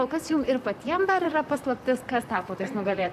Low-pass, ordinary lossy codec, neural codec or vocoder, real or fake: 14.4 kHz; AAC, 96 kbps; vocoder, 44.1 kHz, 128 mel bands, Pupu-Vocoder; fake